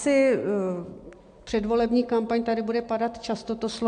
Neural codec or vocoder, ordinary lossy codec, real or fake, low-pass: none; AAC, 64 kbps; real; 9.9 kHz